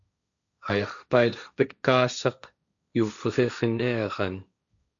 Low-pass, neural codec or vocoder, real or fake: 7.2 kHz; codec, 16 kHz, 1.1 kbps, Voila-Tokenizer; fake